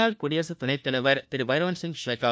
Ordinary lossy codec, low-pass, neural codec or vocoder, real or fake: none; none; codec, 16 kHz, 1 kbps, FunCodec, trained on LibriTTS, 50 frames a second; fake